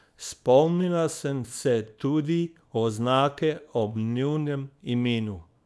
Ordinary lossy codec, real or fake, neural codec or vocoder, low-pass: none; fake; codec, 24 kHz, 0.9 kbps, WavTokenizer, small release; none